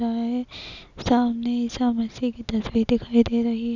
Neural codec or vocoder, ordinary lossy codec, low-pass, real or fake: none; none; 7.2 kHz; real